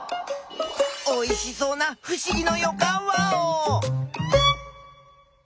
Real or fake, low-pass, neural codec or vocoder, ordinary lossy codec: real; none; none; none